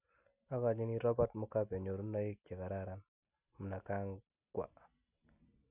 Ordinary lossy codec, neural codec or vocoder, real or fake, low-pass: none; none; real; 3.6 kHz